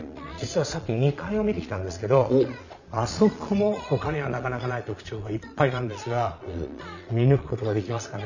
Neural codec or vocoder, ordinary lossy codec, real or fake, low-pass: vocoder, 22.05 kHz, 80 mel bands, Vocos; none; fake; 7.2 kHz